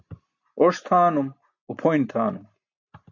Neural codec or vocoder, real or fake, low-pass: none; real; 7.2 kHz